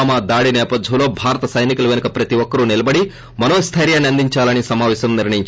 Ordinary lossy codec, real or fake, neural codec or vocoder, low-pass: none; real; none; none